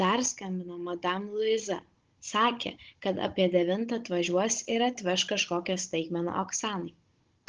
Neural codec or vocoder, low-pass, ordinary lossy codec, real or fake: codec, 16 kHz, 16 kbps, FunCodec, trained on Chinese and English, 50 frames a second; 7.2 kHz; Opus, 16 kbps; fake